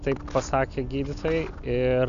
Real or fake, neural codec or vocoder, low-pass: real; none; 7.2 kHz